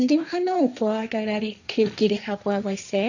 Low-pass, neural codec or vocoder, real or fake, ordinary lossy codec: 7.2 kHz; codec, 16 kHz, 1.1 kbps, Voila-Tokenizer; fake; none